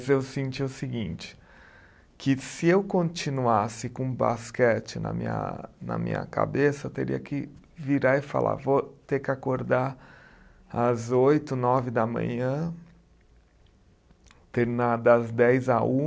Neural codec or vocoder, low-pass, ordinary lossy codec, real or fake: none; none; none; real